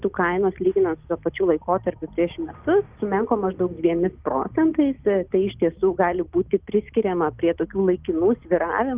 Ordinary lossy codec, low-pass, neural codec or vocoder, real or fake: Opus, 32 kbps; 3.6 kHz; none; real